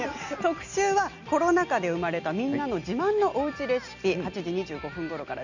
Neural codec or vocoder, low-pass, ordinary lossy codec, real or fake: none; 7.2 kHz; none; real